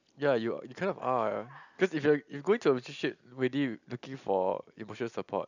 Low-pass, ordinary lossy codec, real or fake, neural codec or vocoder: 7.2 kHz; none; real; none